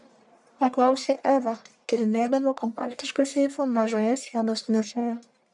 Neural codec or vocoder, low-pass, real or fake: codec, 44.1 kHz, 1.7 kbps, Pupu-Codec; 10.8 kHz; fake